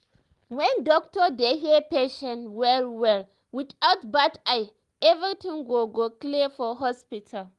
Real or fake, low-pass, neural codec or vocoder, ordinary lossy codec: real; 10.8 kHz; none; Opus, 24 kbps